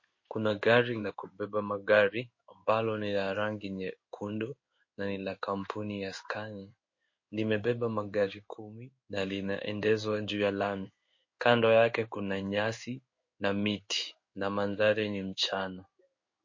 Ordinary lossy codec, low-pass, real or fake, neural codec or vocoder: MP3, 32 kbps; 7.2 kHz; fake; codec, 16 kHz in and 24 kHz out, 1 kbps, XY-Tokenizer